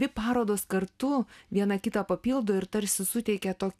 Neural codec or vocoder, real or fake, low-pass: none; real; 14.4 kHz